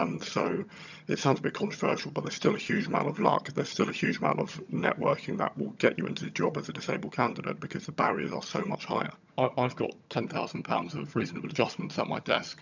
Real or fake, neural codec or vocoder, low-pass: fake; vocoder, 22.05 kHz, 80 mel bands, HiFi-GAN; 7.2 kHz